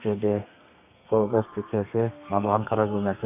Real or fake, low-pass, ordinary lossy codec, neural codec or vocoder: fake; 3.6 kHz; AAC, 32 kbps; codec, 32 kHz, 1.9 kbps, SNAC